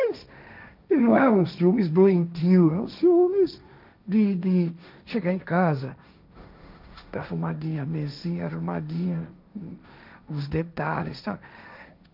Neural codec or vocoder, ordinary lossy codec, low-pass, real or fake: codec, 16 kHz, 1.1 kbps, Voila-Tokenizer; none; 5.4 kHz; fake